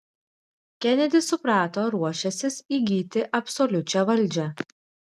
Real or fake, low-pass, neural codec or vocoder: real; 14.4 kHz; none